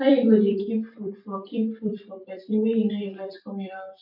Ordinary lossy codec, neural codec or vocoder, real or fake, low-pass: MP3, 48 kbps; vocoder, 44.1 kHz, 128 mel bands every 256 samples, BigVGAN v2; fake; 5.4 kHz